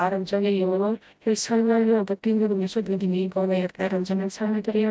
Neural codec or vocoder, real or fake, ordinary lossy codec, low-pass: codec, 16 kHz, 0.5 kbps, FreqCodec, smaller model; fake; none; none